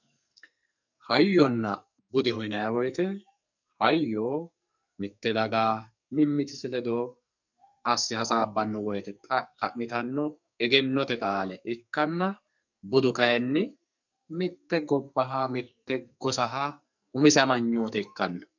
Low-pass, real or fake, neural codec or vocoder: 7.2 kHz; fake; codec, 44.1 kHz, 2.6 kbps, SNAC